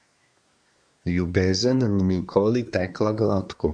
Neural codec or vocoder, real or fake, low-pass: codec, 24 kHz, 1 kbps, SNAC; fake; 9.9 kHz